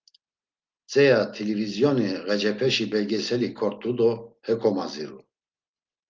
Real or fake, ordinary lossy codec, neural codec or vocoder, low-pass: real; Opus, 32 kbps; none; 7.2 kHz